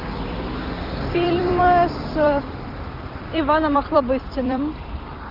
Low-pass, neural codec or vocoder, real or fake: 5.4 kHz; vocoder, 44.1 kHz, 128 mel bands every 256 samples, BigVGAN v2; fake